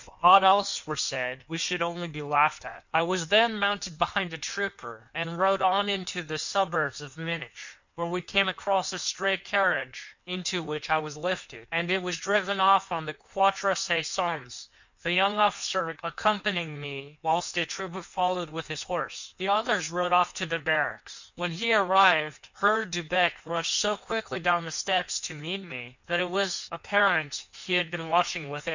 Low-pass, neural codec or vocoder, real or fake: 7.2 kHz; codec, 16 kHz in and 24 kHz out, 1.1 kbps, FireRedTTS-2 codec; fake